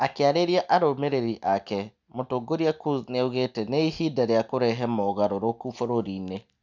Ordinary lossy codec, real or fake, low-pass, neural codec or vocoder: none; real; 7.2 kHz; none